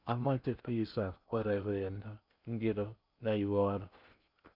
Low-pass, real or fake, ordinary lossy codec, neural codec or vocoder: 5.4 kHz; fake; none; codec, 16 kHz in and 24 kHz out, 0.6 kbps, FocalCodec, streaming, 2048 codes